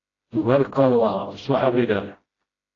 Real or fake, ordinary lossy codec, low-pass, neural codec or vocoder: fake; AAC, 32 kbps; 7.2 kHz; codec, 16 kHz, 0.5 kbps, FreqCodec, smaller model